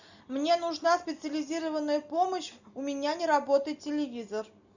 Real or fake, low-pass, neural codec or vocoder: real; 7.2 kHz; none